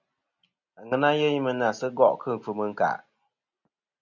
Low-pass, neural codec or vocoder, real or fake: 7.2 kHz; none; real